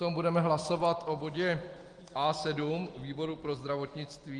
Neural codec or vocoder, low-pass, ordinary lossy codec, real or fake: none; 9.9 kHz; Opus, 24 kbps; real